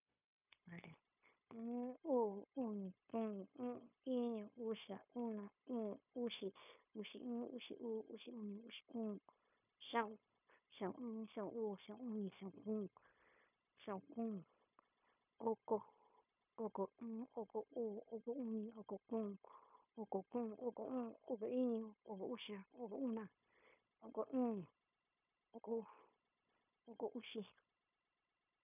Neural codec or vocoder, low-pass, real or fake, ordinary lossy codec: codec, 16 kHz, 0.9 kbps, LongCat-Audio-Codec; 3.6 kHz; fake; none